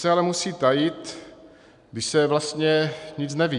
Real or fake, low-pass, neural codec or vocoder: real; 10.8 kHz; none